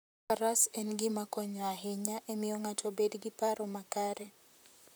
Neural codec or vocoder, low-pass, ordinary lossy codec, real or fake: vocoder, 44.1 kHz, 128 mel bands, Pupu-Vocoder; none; none; fake